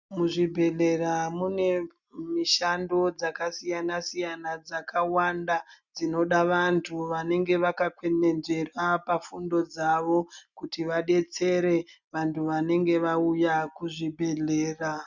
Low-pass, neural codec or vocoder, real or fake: 7.2 kHz; none; real